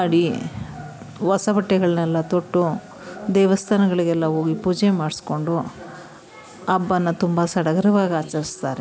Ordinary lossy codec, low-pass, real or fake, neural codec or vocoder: none; none; real; none